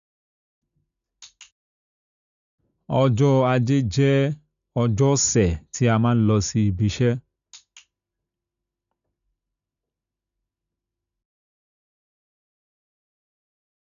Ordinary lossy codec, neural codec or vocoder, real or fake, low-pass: none; none; real; 7.2 kHz